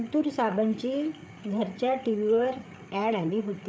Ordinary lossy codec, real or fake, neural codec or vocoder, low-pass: none; fake; codec, 16 kHz, 8 kbps, FreqCodec, larger model; none